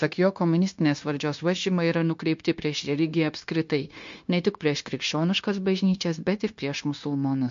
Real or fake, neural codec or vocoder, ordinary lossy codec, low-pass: fake; codec, 16 kHz, 0.9 kbps, LongCat-Audio-Codec; MP3, 48 kbps; 7.2 kHz